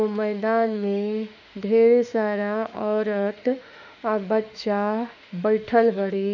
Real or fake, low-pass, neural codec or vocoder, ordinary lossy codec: fake; 7.2 kHz; autoencoder, 48 kHz, 32 numbers a frame, DAC-VAE, trained on Japanese speech; none